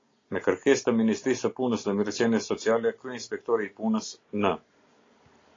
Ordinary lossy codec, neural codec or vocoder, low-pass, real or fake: AAC, 32 kbps; none; 7.2 kHz; real